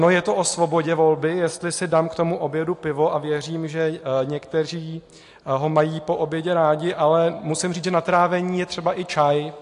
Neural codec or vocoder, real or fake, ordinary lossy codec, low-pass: none; real; AAC, 48 kbps; 10.8 kHz